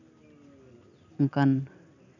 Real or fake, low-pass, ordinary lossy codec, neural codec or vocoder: real; 7.2 kHz; none; none